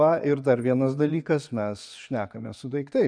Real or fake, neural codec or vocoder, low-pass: fake; vocoder, 24 kHz, 100 mel bands, Vocos; 9.9 kHz